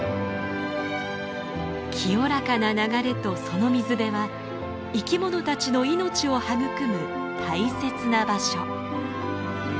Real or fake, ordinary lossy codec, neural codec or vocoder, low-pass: real; none; none; none